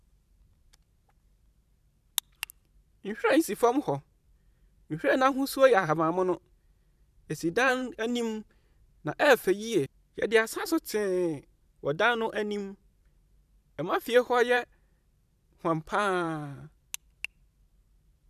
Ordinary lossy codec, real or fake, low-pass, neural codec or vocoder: none; fake; 14.4 kHz; vocoder, 44.1 kHz, 128 mel bands every 512 samples, BigVGAN v2